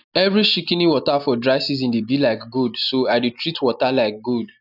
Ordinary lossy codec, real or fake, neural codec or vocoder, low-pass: none; real; none; 5.4 kHz